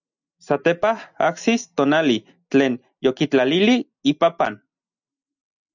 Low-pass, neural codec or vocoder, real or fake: 7.2 kHz; none; real